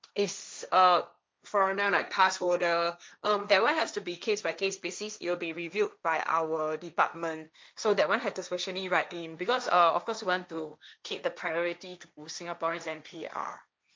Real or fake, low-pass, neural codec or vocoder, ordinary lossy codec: fake; none; codec, 16 kHz, 1.1 kbps, Voila-Tokenizer; none